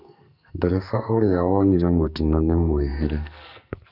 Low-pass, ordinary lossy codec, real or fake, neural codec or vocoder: 5.4 kHz; none; fake; codec, 44.1 kHz, 2.6 kbps, SNAC